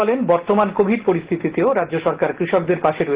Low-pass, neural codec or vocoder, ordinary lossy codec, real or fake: 3.6 kHz; none; Opus, 16 kbps; real